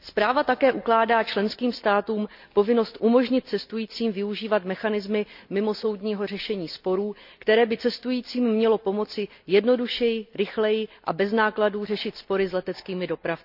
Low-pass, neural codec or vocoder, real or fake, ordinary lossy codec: 5.4 kHz; none; real; none